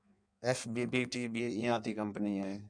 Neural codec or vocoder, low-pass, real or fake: codec, 16 kHz in and 24 kHz out, 1.1 kbps, FireRedTTS-2 codec; 9.9 kHz; fake